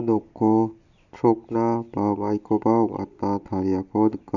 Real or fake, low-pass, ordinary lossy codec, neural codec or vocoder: real; 7.2 kHz; none; none